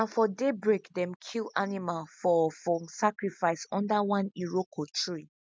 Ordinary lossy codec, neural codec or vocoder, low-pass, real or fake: none; none; none; real